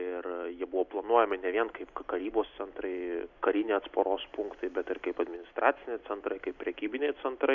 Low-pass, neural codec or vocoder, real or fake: 7.2 kHz; none; real